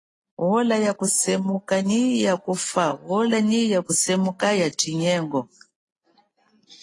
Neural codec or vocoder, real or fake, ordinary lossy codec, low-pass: none; real; AAC, 32 kbps; 10.8 kHz